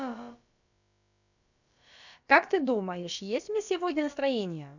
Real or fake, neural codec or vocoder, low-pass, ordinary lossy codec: fake; codec, 16 kHz, about 1 kbps, DyCAST, with the encoder's durations; 7.2 kHz; none